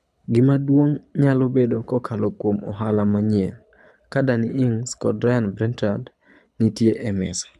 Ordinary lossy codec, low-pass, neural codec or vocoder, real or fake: none; none; codec, 24 kHz, 6 kbps, HILCodec; fake